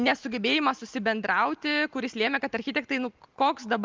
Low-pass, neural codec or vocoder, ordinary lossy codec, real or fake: 7.2 kHz; none; Opus, 32 kbps; real